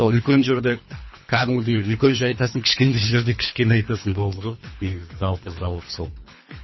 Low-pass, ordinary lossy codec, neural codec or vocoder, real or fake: 7.2 kHz; MP3, 24 kbps; codec, 24 kHz, 3 kbps, HILCodec; fake